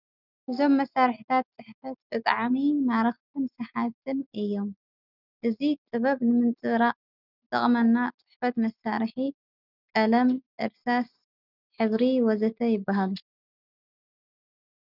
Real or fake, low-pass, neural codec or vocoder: real; 5.4 kHz; none